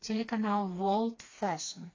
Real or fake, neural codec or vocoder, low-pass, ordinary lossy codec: fake; codec, 16 kHz, 2 kbps, FreqCodec, smaller model; 7.2 kHz; AAC, 32 kbps